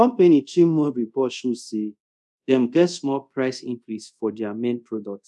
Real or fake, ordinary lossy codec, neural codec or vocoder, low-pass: fake; none; codec, 24 kHz, 0.5 kbps, DualCodec; none